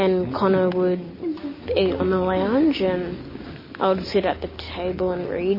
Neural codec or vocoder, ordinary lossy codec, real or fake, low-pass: none; MP3, 24 kbps; real; 5.4 kHz